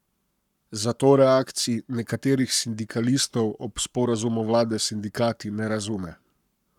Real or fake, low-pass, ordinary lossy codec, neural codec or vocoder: fake; 19.8 kHz; none; codec, 44.1 kHz, 7.8 kbps, Pupu-Codec